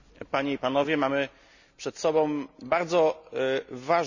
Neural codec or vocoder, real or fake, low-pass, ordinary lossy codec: none; real; 7.2 kHz; none